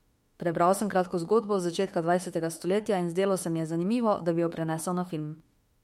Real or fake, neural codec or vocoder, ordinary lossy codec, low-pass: fake; autoencoder, 48 kHz, 32 numbers a frame, DAC-VAE, trained on Japanese speech; MP3, 64 kbps; 19.8 kHz